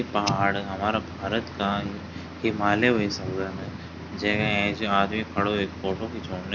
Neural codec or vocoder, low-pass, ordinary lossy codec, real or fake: none; 7.2 kHz; none; real